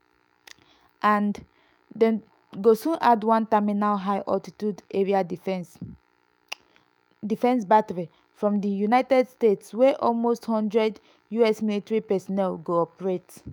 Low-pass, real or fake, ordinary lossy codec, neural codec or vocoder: none; fake; none; autoencoder, 48 kHz, 128 numbers a frame, DAC-VAE, trained on Japanese speech